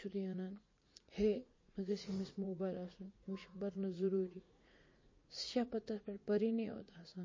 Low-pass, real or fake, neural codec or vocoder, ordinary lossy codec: 7.2 kHz; fake; vocoder, 44.1 kHz, 80 mel bands, Vocos; MP3, 32 kbps